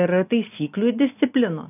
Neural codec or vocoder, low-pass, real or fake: none; 3.6 kHz; real